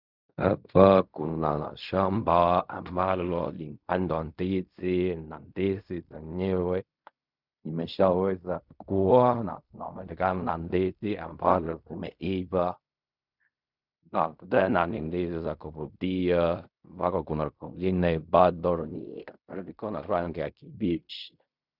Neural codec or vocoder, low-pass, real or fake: codec, 16 kHz in and 24 kHz out, 0.4 kbps, LongCat-Audio-Codec, fine tuned four codebook decoder; 5.4 kHz; fake